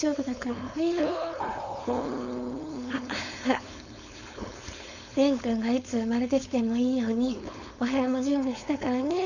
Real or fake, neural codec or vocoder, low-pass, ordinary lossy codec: fake; codec, 16 kHz, 4.8 kbps, FACodec; 7.2 kHz; none